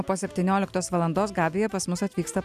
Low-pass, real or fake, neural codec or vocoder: 14.4 kHz; real; none